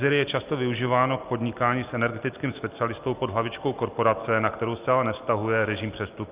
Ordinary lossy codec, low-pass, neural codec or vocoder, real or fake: Opus, 24 kbps; 3.6 kHz; none; real